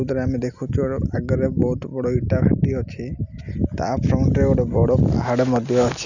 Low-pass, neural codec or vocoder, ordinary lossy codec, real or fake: 7.2 kHz; none; none; real